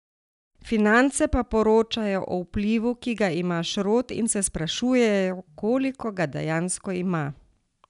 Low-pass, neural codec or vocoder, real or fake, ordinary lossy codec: 10.8 kHz; none; real; none